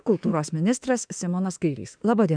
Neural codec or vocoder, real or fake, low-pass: autoencoder, 48 kHz, 32 numbers a frame, DAC-VAE, trained on Japanese speech; fake; 9.9 kHz